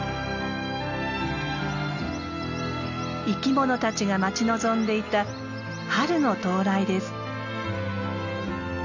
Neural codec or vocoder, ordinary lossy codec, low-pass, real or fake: none; none; 7.2 kHz; real